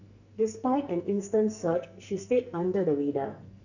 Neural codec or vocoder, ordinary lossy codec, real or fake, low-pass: codec, 44.1 kHz, 2.6 kbps, SNAC; none; fake; 7.2 kHz